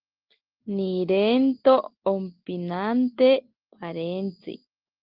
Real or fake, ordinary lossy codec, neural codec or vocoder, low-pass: real; Opus, 16 kbps; none; 5.4 kHz